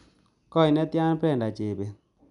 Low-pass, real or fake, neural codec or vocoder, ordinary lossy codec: 10.8 kHz; real; none; none